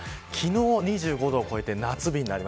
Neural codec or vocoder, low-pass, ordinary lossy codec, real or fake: none; none; none; real